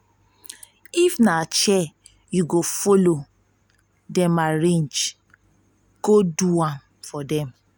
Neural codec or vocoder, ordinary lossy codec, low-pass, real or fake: none; none; none; real